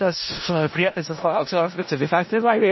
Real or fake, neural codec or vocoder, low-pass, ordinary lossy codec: fake; codec, 16 kHz in and 24 kHz out, 0.4 kbps, LongCat-Audio-Codec, four codebook decoder; 7.2 kHz; MP3, 24 kbps